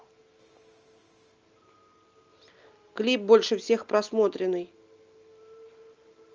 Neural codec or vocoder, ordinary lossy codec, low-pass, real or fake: none; Opus, 24 kbps; 7.2 kHz; real